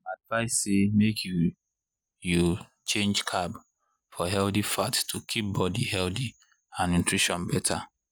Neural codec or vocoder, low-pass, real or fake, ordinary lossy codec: none; none; real; none